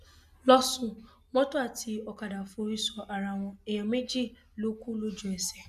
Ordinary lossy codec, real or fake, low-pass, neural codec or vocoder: none; real; 14.4 kHz; none